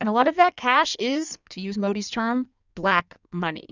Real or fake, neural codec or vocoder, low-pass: fake; codec, 16 kHz in and 24 kHz out, 1.1 kbps, FireRedTTS-2 codec; 7.2 kHz